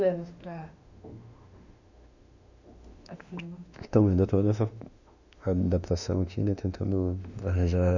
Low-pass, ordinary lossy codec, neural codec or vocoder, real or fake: 7.2 kHz; none; autoencoder, 48 kHz, 32 numbers a frame, DAC-VAE, trained on Japanese speech; fake